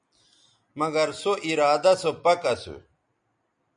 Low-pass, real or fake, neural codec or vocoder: 9.9 kHz; real; none